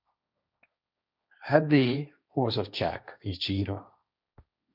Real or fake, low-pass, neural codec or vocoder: fake; 5.4 kHz; codec, 16 kHz, 1.1 kbps, Voila-Tokenizer